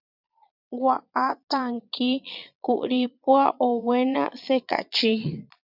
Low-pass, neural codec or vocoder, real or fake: 5.4 kHz; vocoder, 22.05 kHz, 80 mel bands, Vocos; fake